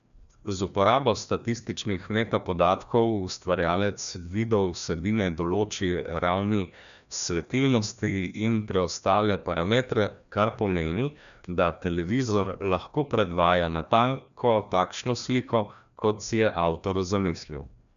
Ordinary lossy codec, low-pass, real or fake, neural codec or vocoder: none; 7.2 kHz; fake; codec, 16 kHz, 1 kbps, FreqCodec, larger model